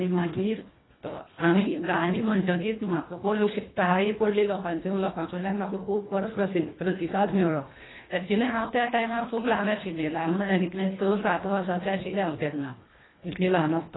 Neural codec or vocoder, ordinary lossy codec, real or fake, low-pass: codec, 24 kHz, 1.5 kbps, HILCodec; AAC, 16 kbps; fake; 7.2 kHz